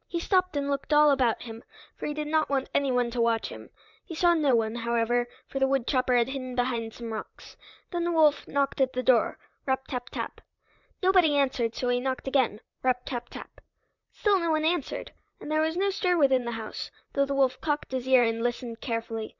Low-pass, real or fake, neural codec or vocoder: 7.2 kHz; fake; vocoder, 44.1 kHz, 128 mel bands, Pupu-Vocoder